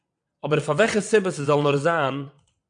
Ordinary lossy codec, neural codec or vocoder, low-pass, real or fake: MP3, 64 kbps; vocoder, 22.05 kHz, 80 mel bands, WaveNeXt; 9.9 kHz; fake